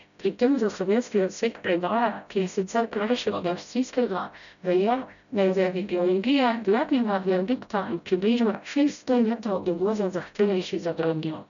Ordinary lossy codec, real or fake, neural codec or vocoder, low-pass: none; fake; codec, 16 kHz, 0.5 kbps, FreqCodec, smaller model; 7.2 kHz